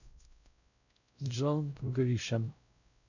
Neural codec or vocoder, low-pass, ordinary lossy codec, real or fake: codec, 16 kHz, 0.5 kbps, X-Codec, WavLM features, trained on Multilingual LibriSpeech; 7.2 kHz; none; fake